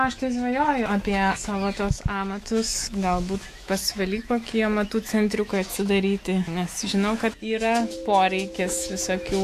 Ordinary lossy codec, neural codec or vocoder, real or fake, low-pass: AAC, 64 kbps; autoencoder, 48 kHz, 128 numbers a frame, DAC-VAE, trained on Japanese speech; fake; 14.4 kHz